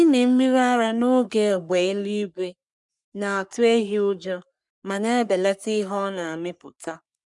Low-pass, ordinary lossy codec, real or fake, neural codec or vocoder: 10.8 kHz; none; fake; codec, 44.1 kHz, 3.4 kbps, Pupu-Codec